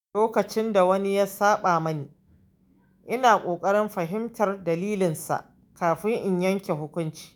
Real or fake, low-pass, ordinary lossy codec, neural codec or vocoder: fake; none; none; autoencoder, 48 kHz, 128 numbers a frame, DAC-VAE, trained on Japanese speech